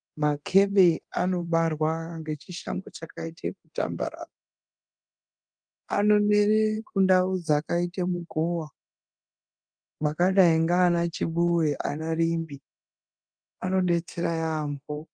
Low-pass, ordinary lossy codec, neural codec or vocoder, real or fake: 9.9 kHz; Opus, 32 kbps; codec, 24 kHz, 0.9 kbps, DualCodec; fake